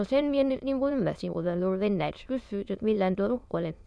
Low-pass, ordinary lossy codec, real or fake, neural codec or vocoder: none; none; fake; autoencoder, 22.05 kHz, a latent of 192 numbers a frame, VITS, trained on many speakers